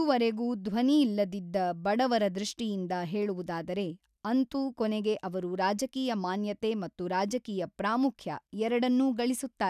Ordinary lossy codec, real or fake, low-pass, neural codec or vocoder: none; real; 14.4 kHz; none